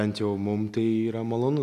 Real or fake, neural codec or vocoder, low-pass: real; none; 14.4 kHz